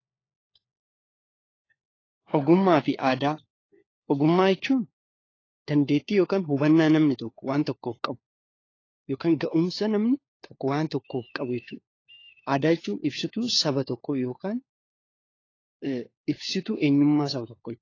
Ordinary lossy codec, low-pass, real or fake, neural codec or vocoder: AAC, 32 kbps; 7.2 kHz; fake; codec, 16 kHz, 4 kbps, FunCodec, trained on LibriTTS, 50 frames a second